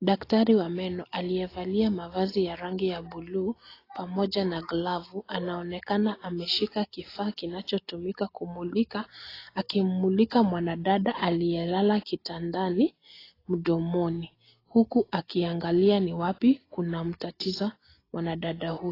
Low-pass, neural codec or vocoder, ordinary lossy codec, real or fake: 5.4 kHz; none; AAC, 24 kbps; real